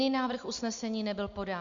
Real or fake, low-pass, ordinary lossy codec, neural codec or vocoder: real; 7.2 kHz; Opus, 64 kbps; none